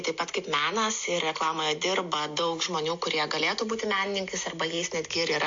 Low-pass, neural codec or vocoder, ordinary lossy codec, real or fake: 7.2 kHz; none; MP3, 48 kbps; real